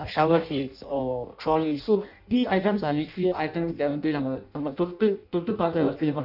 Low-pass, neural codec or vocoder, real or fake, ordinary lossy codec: 5.4 kHz; codec, 16 kHz in and 24 kHz out, 0.6 kbps, FireRedTTS-2 codec; fake; none